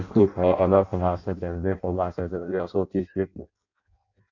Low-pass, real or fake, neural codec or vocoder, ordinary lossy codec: 7.2 kHz; fake; codec, 16 kHz in and 24 kHz out, 0.6 kbps, FireRedTTS-2 codec; none